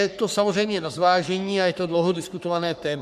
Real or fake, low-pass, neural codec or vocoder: fake; 14.4 kHz; codec, 44.1 kHz, 3.4 kbps, Pupu-Codec